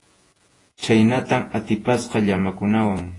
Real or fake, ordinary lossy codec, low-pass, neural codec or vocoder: fake; AAC, 32 kbps; 10.8 kHz; vocoder, 48 kHz, 128 mel bands, Vocos